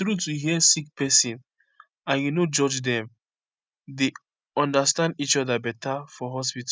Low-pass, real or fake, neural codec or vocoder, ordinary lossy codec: none; real; none; none